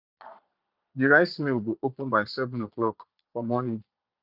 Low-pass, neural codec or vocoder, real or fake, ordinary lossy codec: 5.4 kHz; vocoder, 22.05 kHz, 80 mel bands, Vocos; fake; none